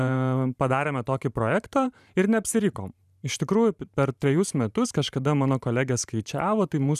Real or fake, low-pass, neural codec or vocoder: fake; 14.4 kHz; vocoder, 44.1 kHz, 128 mel bands every 512 samples, BigVGAN v2